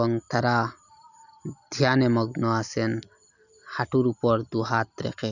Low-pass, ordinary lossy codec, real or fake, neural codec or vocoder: 7.2 kHz; none; real; none